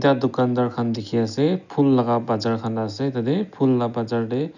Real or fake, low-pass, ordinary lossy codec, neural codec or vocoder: real; 7.2 kHz; none; none